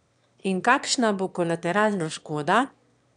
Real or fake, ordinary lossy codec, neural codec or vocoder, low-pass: fake; none; autoencoder, 22.05 kHz, a latent of 192 numbers a frame, VITS, trained on one speaker; 9.9 kHz